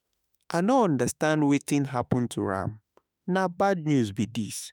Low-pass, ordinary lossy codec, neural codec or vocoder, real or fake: none; none; autoencoder, 48 kHz, 32 numbers a frame, DAC-VAE, trained on Japanese speech; fake